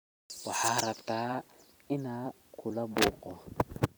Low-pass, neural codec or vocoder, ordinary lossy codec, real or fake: none; vocoder, 44.1 kHz, 128 mel bands every 256 samples, BigVGAN v2; none; fake